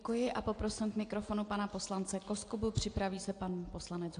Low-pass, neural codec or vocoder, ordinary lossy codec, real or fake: 9.9 kHz; none; AAC, 48 kbps; real